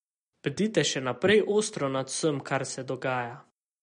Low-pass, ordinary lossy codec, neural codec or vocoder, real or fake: 19.8 kHz; MP3, 48 kbps; none; real